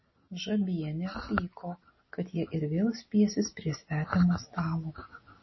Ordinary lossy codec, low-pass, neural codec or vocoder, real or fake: MP3, 24 kbps; 7.2 kHz; none; real